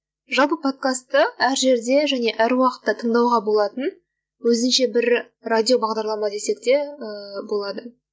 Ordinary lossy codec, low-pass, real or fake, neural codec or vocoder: none; none; real; none